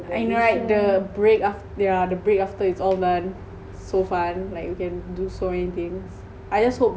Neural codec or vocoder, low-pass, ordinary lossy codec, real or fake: none; none; none; real